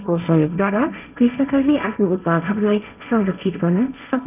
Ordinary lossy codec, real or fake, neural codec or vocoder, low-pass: none; fake; codec, 16 kHz, 1.1 kbps, Voila-Tokenizer; 3.6 kHz